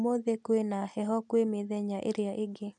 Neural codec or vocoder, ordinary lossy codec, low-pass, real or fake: none; none; 10.8 kHz; real